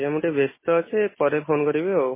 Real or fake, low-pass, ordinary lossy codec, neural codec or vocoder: real; 3.6 kHz; MP3, 16 kbps; none